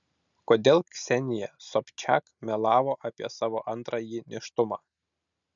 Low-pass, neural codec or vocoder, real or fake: 7.2 kHz; none; real